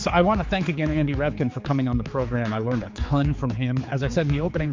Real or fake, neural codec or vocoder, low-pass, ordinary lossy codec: fake; codec, 16 kHz, 4 kbps, X-Codec, HuBERT features, trained on general audio; 7.2 kHz; MP3, 48 kbps